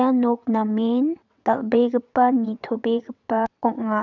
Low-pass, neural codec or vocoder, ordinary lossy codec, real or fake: 7.2 kHz; vocoder, 22.05 kHz, 80 mel bands, WaveNeXt; none; fake